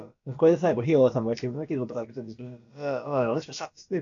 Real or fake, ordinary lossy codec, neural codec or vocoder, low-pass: fake; AAC, 64 kbps; codec, 16 kHz, about 1 kbps, DyCAST, with the encoder's durations; 7.2 kHz